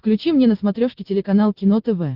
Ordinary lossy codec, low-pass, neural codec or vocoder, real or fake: Opus, 16 kbps; 5.4 kHz; none; real